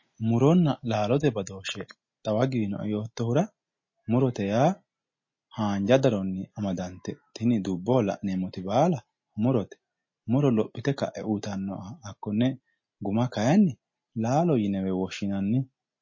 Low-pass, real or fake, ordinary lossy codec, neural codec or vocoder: 7.2 kHz; real; MP3, 32 kbps; none